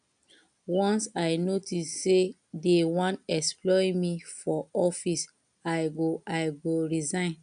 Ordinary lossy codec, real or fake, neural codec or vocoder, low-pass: none; real; none; 9.9 kHz